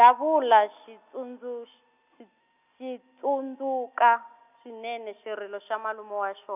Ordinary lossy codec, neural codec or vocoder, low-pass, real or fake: none; none; 3.6 kHz; real